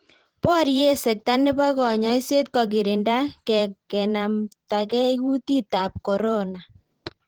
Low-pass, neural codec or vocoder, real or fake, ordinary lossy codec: 19.8 kHz; vocoder, 44.1 kHz, 128 mel bands every 512 samples, BigVGAN v2; fake; Opus, 16 kbps